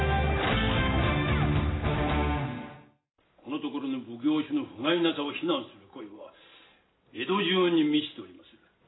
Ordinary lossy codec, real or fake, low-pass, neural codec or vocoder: AAC, 16 kbps; real; 7.2 kHz; none